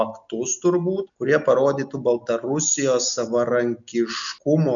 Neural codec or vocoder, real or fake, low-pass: none; real; 7.2 kHz